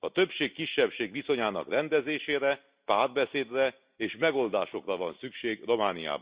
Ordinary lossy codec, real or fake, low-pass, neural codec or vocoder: Opus, 64 kbps; real; 3.6 kHz; none